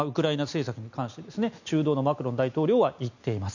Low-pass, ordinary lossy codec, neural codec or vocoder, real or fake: 7.2 kHz; none; none; real